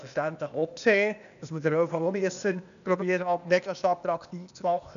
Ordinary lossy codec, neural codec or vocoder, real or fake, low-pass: none; codec, 16 kHz, 0.8 kbps, ZipCodec; fake; 7.2 kHz